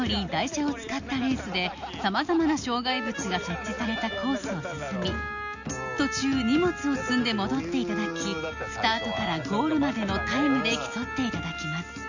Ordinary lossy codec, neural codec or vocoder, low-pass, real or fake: none; none; 7.2 kHz; real